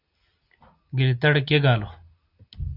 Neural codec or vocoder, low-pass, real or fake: none; 5.4 kHz; real